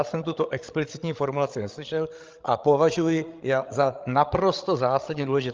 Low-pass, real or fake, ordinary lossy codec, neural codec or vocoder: 7.2 kHz; fake; Opus, 24 kbps; codec, 16 kHz, 16 kbps, FreqCodec, larger model